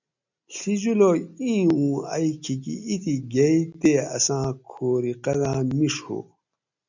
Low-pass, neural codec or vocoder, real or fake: 7.2 kHz; none; real